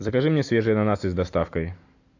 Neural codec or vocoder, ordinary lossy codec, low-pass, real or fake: none; AAC, 48 kbps; 7.2 kHz; real